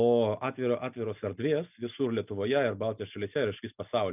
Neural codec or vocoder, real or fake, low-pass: none; real; 3.6 kHz